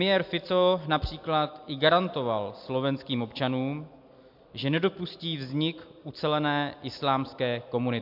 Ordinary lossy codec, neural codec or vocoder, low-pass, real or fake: MP3, 48 kbps; none; 5.4 kHz; real